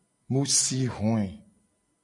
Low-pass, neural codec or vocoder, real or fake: 10.8 kHz; none; real